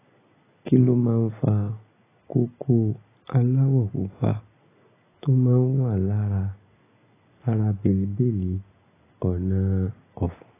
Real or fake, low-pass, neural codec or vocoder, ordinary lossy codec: real; 3.6 kHz; none; AAC, 16 kbps